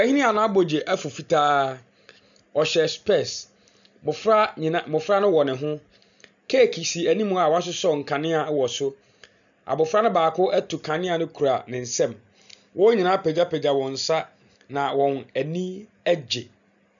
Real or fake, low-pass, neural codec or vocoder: real; 7.2 kHz; none